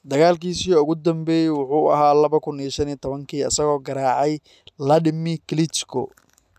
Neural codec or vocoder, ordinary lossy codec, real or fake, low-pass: none; none; real; 14.4 kHz